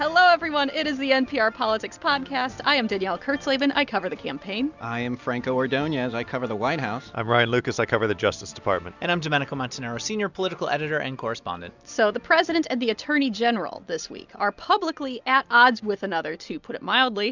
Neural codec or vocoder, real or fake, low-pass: none; real; 7.2 kHz